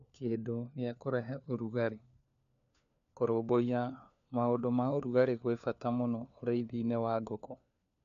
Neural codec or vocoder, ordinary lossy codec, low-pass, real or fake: codec, 16 kHz, 4 kbps, FunCodec, trained on LibriTTS, 50 frames a second; none; 7.2 kHz; fake